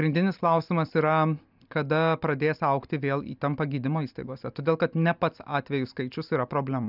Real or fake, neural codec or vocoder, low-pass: real; none; 5.4 kHz